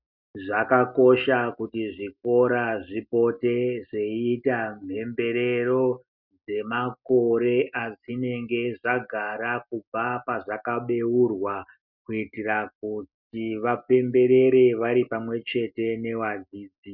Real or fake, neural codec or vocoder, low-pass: real; none; 5.4 kHz